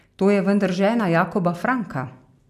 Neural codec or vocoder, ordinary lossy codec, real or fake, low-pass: none; AAC, 96 kbps; real; 14.4 kHz